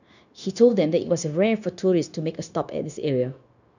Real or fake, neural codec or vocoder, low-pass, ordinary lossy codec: fake; codec, 16 kHz, 0.9 kbps, LongCat-Audio-Codec; 7.2 kHz; none